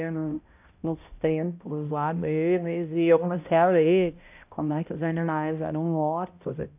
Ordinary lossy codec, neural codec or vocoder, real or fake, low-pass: AAC, 32 kbps; codec, 16 kHz, 0.5 kbps, X-Codec, HuBERT features, trained on balanced general audio; fake; 3.6 kHz